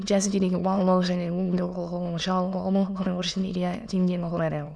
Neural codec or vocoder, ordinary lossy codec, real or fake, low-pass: autoencoder, 22.05 kHz, a latent of 192 numbers a frame, VITS, trained on many speakers; none; fake; none